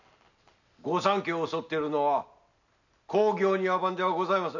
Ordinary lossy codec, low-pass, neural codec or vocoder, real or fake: none; 7.2 kHz; none; real